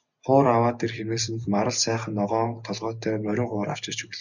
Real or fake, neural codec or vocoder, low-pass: real; none; 7.2 kHz